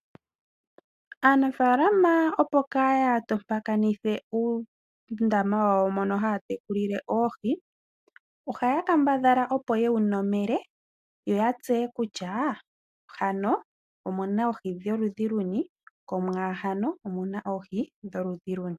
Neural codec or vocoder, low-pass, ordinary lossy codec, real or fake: none; 14.4 kHz; AAC, 96 kbps; real